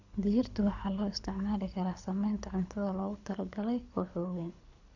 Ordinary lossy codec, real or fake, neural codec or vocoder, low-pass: none; fake; codec, 24 kHz, 6 kbps, HILCodec; 7.2 kHz